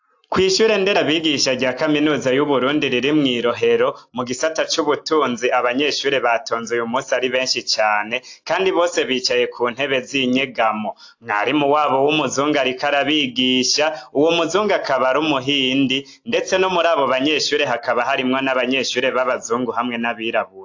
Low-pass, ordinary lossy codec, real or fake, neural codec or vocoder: 7.2 kHz; AAC, 48 kbps; real; none